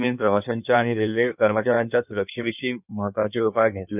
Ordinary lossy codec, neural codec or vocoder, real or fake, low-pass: none; codec, 16 kHz in and 24 kHz out, 1.1 kbps, FireRedTTS-2 codec; fake; 3.6 kHz